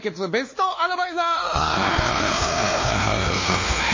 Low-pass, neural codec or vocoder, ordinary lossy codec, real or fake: 7.2 kHz; codec, 16 kHz, 2 kbps, X-Codec, WavLM features, trained on Multilingual LibriSpeech; MP3, 32 kbps; fake